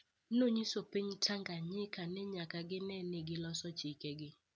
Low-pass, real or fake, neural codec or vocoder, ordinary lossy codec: none; real; none; none